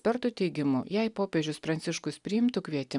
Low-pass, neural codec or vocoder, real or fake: 10.8 kHz; none; real